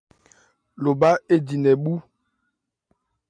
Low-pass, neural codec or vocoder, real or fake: 9.9 kHz; none; real